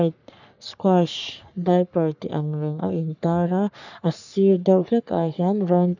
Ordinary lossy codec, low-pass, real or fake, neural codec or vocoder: none; 7.2 kHz; fake; codec, 44.1 kHz, 3.4 kbps, Pupu-Codec